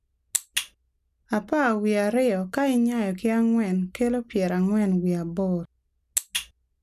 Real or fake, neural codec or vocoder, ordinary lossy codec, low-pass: real; none; none; 14.4 kHz